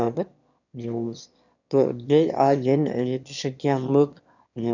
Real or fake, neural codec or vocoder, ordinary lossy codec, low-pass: fake; autoencoder, 22.05 kHz, a latent of 192 numbers a frame, VITS, trained on one speaker; none; 7.2 kHz